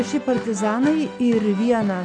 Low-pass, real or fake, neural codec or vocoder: 9.9 kHz; real; none